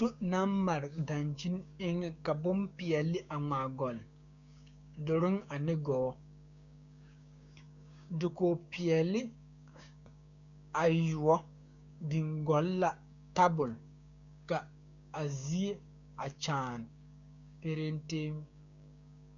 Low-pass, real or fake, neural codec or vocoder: 7.2 kHz; fake; codec, 16 kHz, 6 kbps, DAC